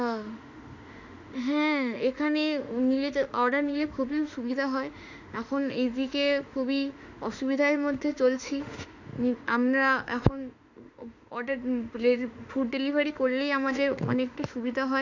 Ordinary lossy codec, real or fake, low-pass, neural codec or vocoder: none; fake; 7.2 kHz; autoencoder, 48 kHz, 32 numbers a frame, DAC-VAE, trained on Japanese speech